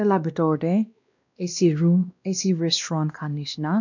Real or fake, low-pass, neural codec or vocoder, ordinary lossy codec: fake; 7.2 kHz; codec, 16 kHz, 1 kbps, X-Codec, WavLM features, trained on Multilingual LibriSpeech; none